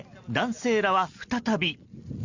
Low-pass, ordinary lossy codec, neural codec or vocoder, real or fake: 7.2 kHz; Opus, 64 kbps; none; real